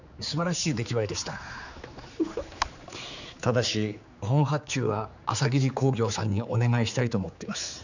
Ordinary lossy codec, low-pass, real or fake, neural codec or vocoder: none; 7.2 kHz; fake; codec, 16 kHz, 4 kbps, X-Codec, HuBERT features, trained on general audio